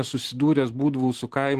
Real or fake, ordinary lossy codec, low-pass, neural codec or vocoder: real; Opus, 16 kbps; 14.4 kHz; none